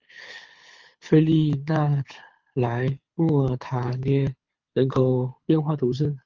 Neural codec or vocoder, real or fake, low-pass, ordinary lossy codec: codec, 24 kHz, 6 kbps, HILCodec; fake; 7.2 kHz; Opus, 32 kbps